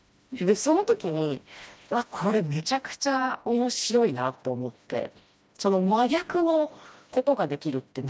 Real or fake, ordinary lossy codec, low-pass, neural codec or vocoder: fake; none; none; codec, 16 kHz, 1 kbps, FreqCodec, smaller model